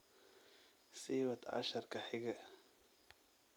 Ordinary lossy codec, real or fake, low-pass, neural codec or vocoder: none; fake; none; vocoder, 44.1 kHz, 128 mel bands every 256 samples, BigVGAN v2